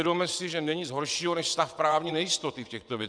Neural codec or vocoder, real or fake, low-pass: vocoder, 22.05 kHz, 80 mel bands, WaveNeXt; fake; 9.9 kHz